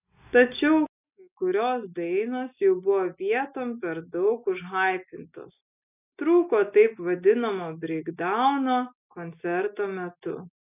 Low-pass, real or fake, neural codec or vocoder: 3.6 kHz; real; none